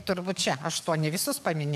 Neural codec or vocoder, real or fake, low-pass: codec, 44.1 kHz, 7.8 kbps, DAC; fake; 14.4 kHz